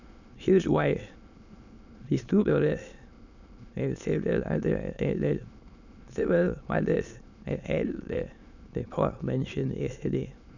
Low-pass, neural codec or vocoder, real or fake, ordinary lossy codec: 7.2 kHz; autoencoder, 22.05 kHz, a latent of 192 numbers a frame, VITS, trained on many speakers; fake; none